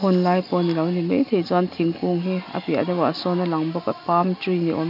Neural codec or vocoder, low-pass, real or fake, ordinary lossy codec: none; 5.4 kHz; real; none